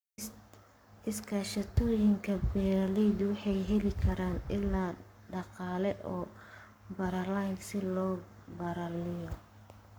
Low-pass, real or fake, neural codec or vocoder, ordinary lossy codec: none; fake; codec, 44.1 kHz, 7.8 kbps, Pupu-Codec; none